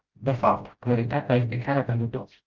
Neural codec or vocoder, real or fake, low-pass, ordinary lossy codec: codec, 16 kHz, 0.5 kbps, FreqCodec, smaller model; fake; 7.2 kHz; Opus, 24 kbps